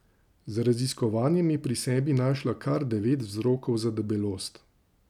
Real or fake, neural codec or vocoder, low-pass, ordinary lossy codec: real; none; 19.8 kHz; none